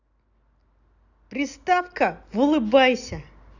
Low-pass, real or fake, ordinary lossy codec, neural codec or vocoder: 7.2 kHz; real; none; none